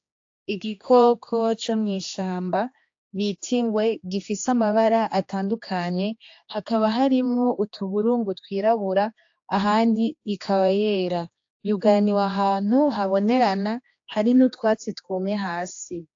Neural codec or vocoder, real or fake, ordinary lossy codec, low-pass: codec, 16 kHz, 2 kbps, X-Codec, HuBERT features, trained on general audio; fake; AAC, 48 kbps; 7.2 kHz